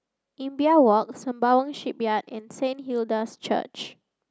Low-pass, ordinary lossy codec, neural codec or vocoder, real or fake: none; none; none; real